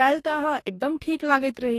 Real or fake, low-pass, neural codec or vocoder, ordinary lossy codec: fake; 14.4 kHz; codec, 44.1 kHz, 2.6 kbps, DAC; AAC, 48 kbps